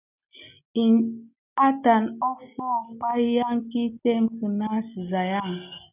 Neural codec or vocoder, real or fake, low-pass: none; real; 3.6 kHz